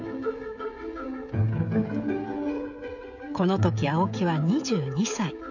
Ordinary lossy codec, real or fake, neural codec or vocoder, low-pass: none; fake; codec, 16 kHz, 16 kbps, FreqCodec, smaller model; 7.2 kHz